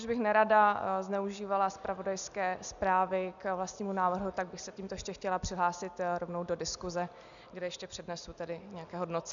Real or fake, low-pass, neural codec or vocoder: real; 7.2 kHz; none